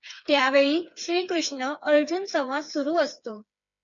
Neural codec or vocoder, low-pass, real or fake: codec, 16 kHz, 4 kbps, FreqCodec, smaller model; 7.2 kHz; fake